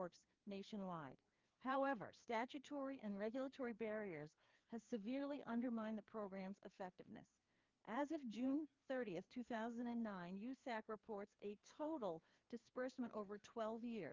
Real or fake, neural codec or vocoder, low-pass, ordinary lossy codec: fake; codec, 16 kHz, 2 kbps, FreqCodec, larger model; 7.2 kHz; Opus, 24 kbps